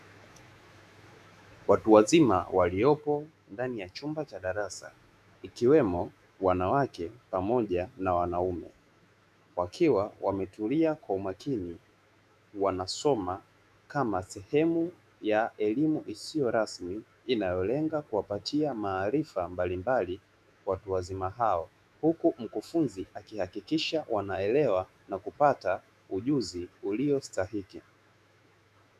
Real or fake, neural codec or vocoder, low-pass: fake; autoencoder, 48 kHz, 128 numbers a frame, DAC-VAE, trained on Japanese speech; 14.4 kHz